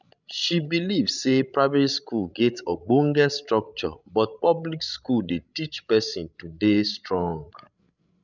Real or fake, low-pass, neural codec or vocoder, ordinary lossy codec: fake; 7.2 kHz; codec, 16 kHz, 16 kbps, FreqCodec, larger model; none